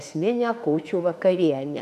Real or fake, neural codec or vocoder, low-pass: fake; autoencoder, 48 kHz, 32 numbers a frame, DAC-VAE, trained on Japanese speech; 14.4 kHz